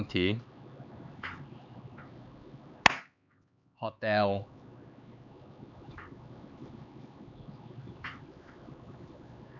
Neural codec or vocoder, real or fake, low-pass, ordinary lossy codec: codec, 16 kHz, 4 kbps, X-Codec, HuBERT features, trained on LibriSpeech; fake; 7.2 kHz; none